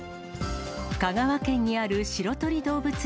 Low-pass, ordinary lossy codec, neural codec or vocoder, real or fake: none; none; none; real